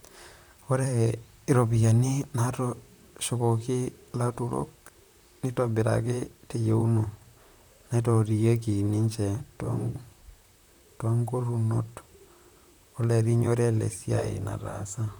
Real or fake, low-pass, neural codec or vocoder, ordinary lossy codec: fake; none; vocoder, 44.1 kHz, 128 mel bands, Pupu-Vocoder; none